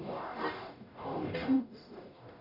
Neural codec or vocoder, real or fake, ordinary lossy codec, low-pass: codec, 44.1 kHz, 0.9 kbps, DAC; fake; none; 5.4 kHz